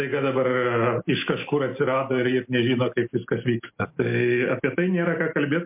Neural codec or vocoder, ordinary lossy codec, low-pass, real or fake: none; MP3, 32 kbps; 3.6 kHz; real